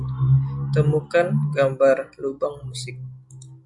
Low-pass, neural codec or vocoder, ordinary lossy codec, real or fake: 10.8 kHz; none; MP3, 64 kbps; real